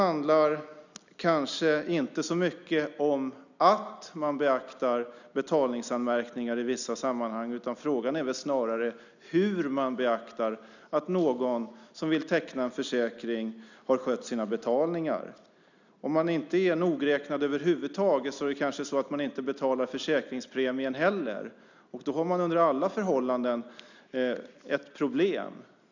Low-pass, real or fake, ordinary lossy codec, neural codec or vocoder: 7.2 kHz; real; none; none